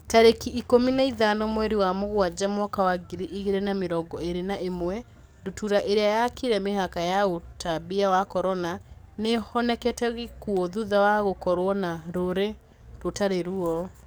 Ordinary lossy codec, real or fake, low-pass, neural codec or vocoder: none; fake; none; codec, 44.1 kHz, 7.8 kbps, DAC